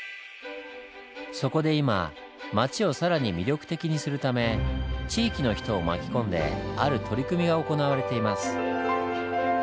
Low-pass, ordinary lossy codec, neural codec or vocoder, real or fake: none; none; none; real